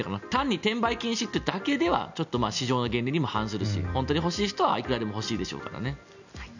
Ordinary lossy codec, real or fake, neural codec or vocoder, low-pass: none; real; none; 7.2 kHz